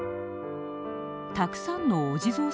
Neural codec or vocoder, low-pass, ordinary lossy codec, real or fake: none; none; none; real